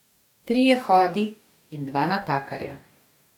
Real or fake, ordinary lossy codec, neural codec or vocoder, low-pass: fake; none; codec, 44.1 kHz, 2.6 kbps, DAC; 19.8 kHz